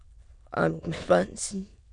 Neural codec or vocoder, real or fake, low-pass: autoencoder, 22.05 kHz, a latent of 192 numbers a frame, VITS, trained on many speakers; fake; 9.9 kHz